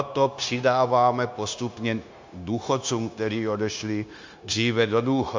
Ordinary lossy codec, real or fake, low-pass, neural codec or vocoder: MP3, 64 kbps; fake; 7.2 kHz; codec, 16 kHz, 0.9 kbps, LongCat-Audio-Codec